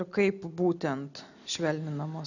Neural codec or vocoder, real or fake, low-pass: none; real; 7.2 kHz